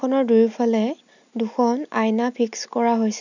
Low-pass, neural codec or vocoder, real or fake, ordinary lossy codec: 7.2 kHz; none; real; none